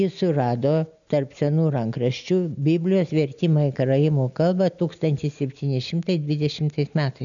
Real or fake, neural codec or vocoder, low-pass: real; none; 7.2 kHz